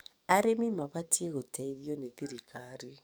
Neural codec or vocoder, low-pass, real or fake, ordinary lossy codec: codec, 44.1 kHz, 7.8 kbps, DAC; none; fake; none